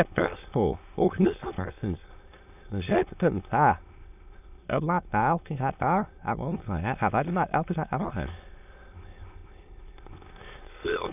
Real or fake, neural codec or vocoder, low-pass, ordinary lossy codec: fake; autoencoder, 22.05 kHz, a latent of 192 numbers a frame, VITS, trained on many speakers; 3.6 kHz; none